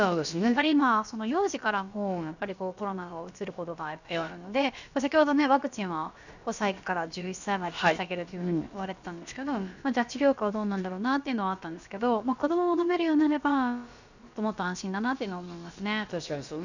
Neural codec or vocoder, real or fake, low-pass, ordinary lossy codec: codec, 16 kHz, about 1 kbps, DyCAST, with the encoder's durations; fake; 7.2 kHz; none